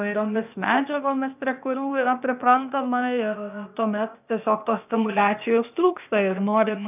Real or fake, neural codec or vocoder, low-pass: fake; codec, 16 kHz, 0.8 kbps, ZipCodec; 3.6 kHz